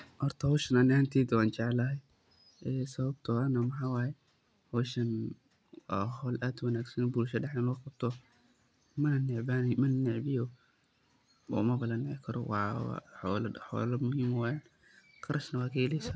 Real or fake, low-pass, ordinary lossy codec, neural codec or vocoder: real; none; none; none